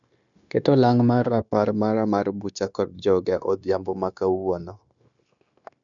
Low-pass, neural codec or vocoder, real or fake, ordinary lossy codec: 7.2 kHz; codec, 16 kHz, 0.9 kbps, LongCat-Audio-Codec; fake; none